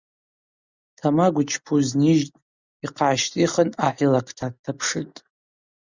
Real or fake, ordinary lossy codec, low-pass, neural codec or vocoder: real; Opus, 64 kbps; 7.2 kHz; none